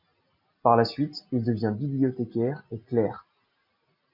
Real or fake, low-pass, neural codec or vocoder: real; 5.4 kHz; none